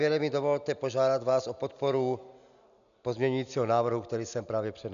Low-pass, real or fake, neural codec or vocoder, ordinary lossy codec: 7.2 kHz; real; none; MP3, 96 kbps